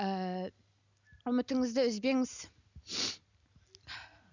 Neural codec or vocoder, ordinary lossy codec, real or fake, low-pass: none; none; real; 7.2 kHz